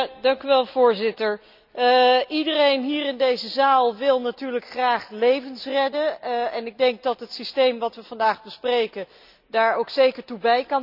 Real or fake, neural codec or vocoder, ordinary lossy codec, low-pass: real; none; none; 5.4 kHz